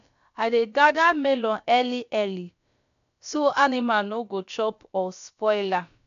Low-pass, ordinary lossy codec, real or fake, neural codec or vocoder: 7.2 kHz; none; fake; codec, 16 kHz, about 1 kbps, DyCAST, with the encoder's durations